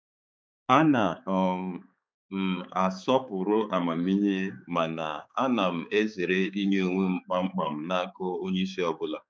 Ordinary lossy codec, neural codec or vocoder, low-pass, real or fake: none; codec, 16 kHz, 4 kbps, X-Codec, HuBERT features, trained on balanced general audio; none; fake